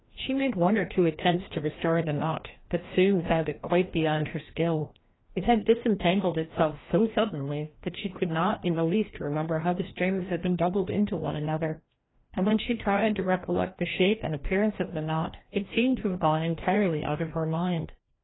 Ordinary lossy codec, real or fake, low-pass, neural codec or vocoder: AAC, 16 kbps; fake; 7.2 kHz; codec, 16 kHz, 1 kbps, FreqCodec, larger model